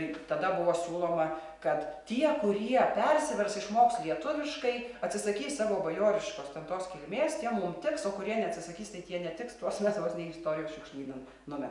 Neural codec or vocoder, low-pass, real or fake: none; 10.8 kHz; real